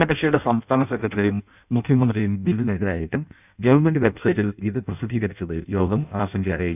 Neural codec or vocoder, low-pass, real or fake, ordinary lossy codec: codec, 16 kHz in and 24 kHz out, 0.6 kbps, FireRedTTS-2 codec; 3.6 kHz; fake; none